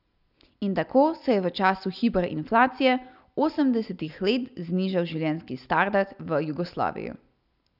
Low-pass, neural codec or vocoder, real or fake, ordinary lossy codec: 5.4 kHz; none; real; none